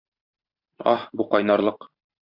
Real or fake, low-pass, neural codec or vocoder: fake; 5.4 kHz; vocoder, 44.1 kHz, 128 mel bands every 512 samples, BigVGAN v2